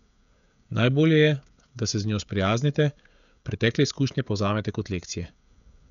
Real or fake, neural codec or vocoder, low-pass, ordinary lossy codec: fake; codec, 16 kHz, 16 kbps, FreqCodec, smaller model; 7.2 kHz; none